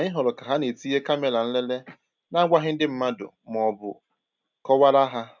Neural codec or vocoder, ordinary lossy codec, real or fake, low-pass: none; none; real; 7.2 kHz